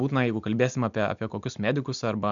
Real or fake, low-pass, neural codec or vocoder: real; 7.2 kHz; none